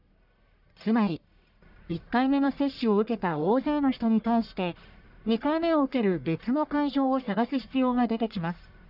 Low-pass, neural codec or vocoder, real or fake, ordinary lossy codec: 5.4 kHz; codec, 44.1 kHz, 1.7 kbps, Pupu-Codec; fake; none